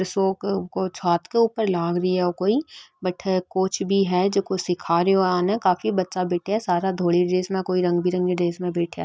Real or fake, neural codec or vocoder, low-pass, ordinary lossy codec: real; none; none; none